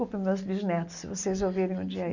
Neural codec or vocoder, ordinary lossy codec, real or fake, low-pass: none; none; real; 7.2 kHz